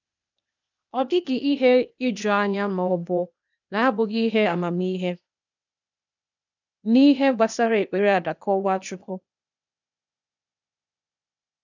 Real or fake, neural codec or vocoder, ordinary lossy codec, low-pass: fake; codec, 16 kHz, 0.8 kbps, ZipCodec; none; 7.2 kHz